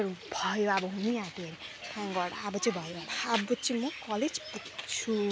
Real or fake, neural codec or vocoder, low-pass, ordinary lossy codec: real; none; none; none